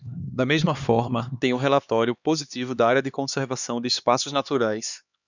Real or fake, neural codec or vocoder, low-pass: fake; codec, 16 kHz, 2 kbps, X-Codec, HuBERT features, trained on LibriSpeech; 7.2 kHz